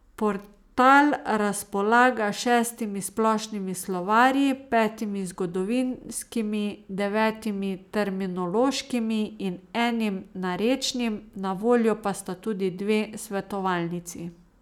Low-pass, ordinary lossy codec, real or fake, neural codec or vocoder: 19.8 kHz; none; real; none